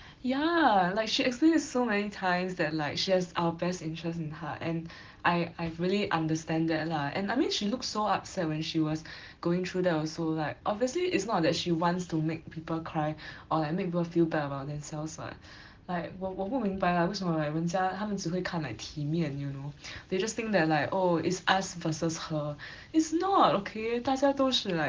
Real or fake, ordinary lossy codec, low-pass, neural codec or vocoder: real; Opus, 16 kbps; 7.2 kHz; none